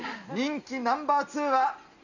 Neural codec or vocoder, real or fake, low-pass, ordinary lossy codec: none; real; 7.2 kHz; none